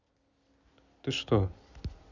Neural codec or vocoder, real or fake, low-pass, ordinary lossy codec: none; real; 7.2 kHz; none